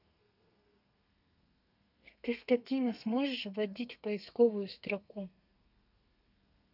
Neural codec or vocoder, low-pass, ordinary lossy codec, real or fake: codec, 32 kHz, 1.9 kbps, SNAC; 5.4 kHz; none; fake